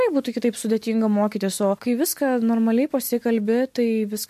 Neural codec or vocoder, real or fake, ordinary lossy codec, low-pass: none; real; MP3, 64 kbps; 14.4 kHz